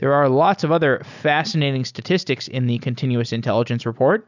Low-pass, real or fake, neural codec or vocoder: 7.2 kHz; real; none